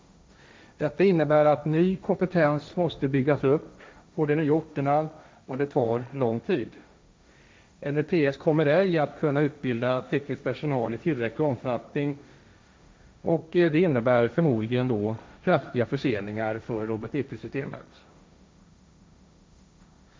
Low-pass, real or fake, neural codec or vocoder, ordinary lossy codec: none; fake; codec, 16 kHz, 1.1 kbps, Voila-Tokenizer; none